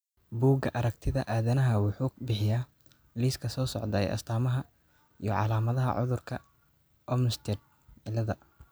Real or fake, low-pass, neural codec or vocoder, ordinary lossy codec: real; none; none; none